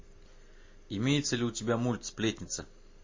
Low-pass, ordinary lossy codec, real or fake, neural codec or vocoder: 7.2 kHz; MP3, 32 kbps; real; none